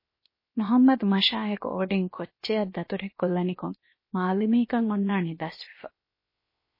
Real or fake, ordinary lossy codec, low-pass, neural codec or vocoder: fake; MP3, 24 kbps; 5.4 kHz; codec, 16 kHz, 0.7 kbps, FocalCodec